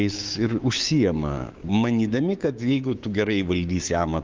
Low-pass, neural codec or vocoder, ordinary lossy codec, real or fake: 7.2 kHz; codec, 16 kHz, 6 kbps, DAC; Opus, 32 kbps; fake